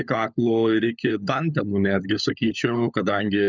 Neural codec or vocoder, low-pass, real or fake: codec, 16 kHz, 16 kbps, FunCodec, trained on LibriTTS, 50 frames a second; 7.2 kHz; fake